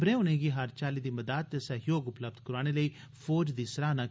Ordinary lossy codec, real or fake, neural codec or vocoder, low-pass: none; real; none; none